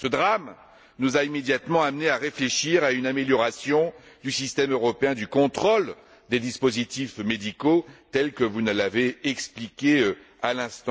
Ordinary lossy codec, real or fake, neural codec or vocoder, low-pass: none; real; none; none